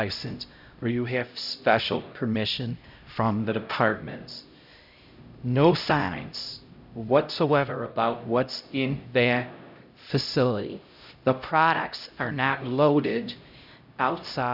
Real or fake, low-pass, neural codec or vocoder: fake; 5.4 kHz; codec, 16 kHz, 0.5 kbps, X-Codec, HuBERT features, trained on LibriSpeech